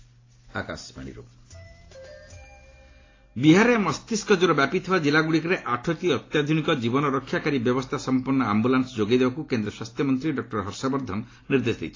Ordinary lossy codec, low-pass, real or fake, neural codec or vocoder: AAC, 32 kbps; 7.2 kHz; real; none